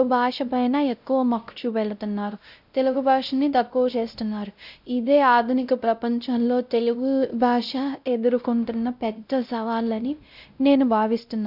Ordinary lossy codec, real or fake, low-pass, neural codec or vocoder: none; fake; 5.4 kHz; codec, 16 kHz, 0.5 kbps, X-Codec, WavLM features, trained on Multilingual LibriSpeech